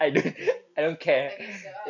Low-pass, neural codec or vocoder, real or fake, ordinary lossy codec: 7.2 kHz; none; real; none